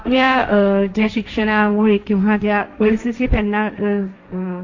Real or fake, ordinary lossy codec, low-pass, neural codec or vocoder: fake; AAC, 48 kbps; 7.2 kHz; codec, 16 kHz, 1.1 kbps, Voila-Tokenizer